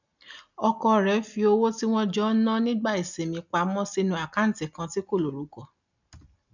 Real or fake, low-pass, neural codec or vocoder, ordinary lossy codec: real; 7.2 kHz; none; none